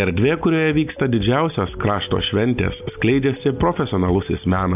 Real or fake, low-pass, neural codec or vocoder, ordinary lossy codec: real; 3.6 kHz; none; AAC, 32 kbps